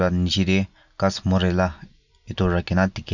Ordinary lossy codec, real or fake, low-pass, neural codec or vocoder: none; real; 7.2 kHz; none